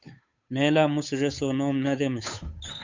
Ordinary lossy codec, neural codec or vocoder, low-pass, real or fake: MP3, 48 kbps; codec, 16 kHz, 8 kbps, FunCodec, trained on Chinese and English, 25 frames a second; 7.2 kHz; fake